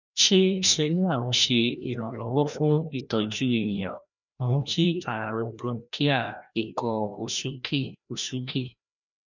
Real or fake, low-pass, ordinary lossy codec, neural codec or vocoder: fake; 7.2 kHz; none; codec, 16 kHz, 1 kbps, FreqCodec, larger model